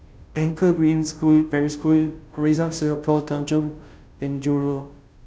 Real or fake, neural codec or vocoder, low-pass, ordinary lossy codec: fake; codec, 16 kHz, 0.5 kbps, FunCodec, trained on Chinese and English, 25 frames a second; none; none